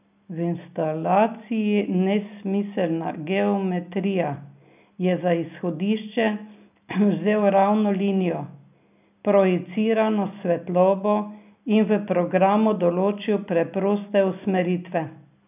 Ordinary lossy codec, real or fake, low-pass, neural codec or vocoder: none; real; 3.6 kHz; none